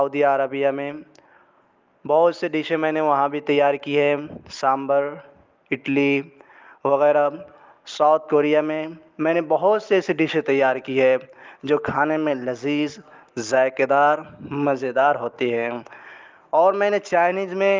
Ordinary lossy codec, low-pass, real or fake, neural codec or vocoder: Opus, 32 kbps; 7.2 kHz; real; none